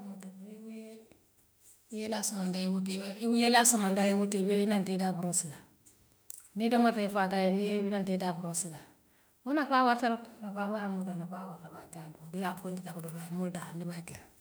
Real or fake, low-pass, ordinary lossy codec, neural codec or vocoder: fake; none; none; autoencoder, 48 kHz, 32 numbers a frame, DAC-VAE, trained on Japanese speech